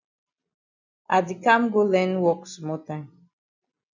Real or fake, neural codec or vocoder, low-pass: real; none; 7.2 kHz